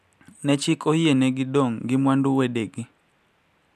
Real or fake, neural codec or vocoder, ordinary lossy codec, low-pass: real; none; none; 14.4 kHz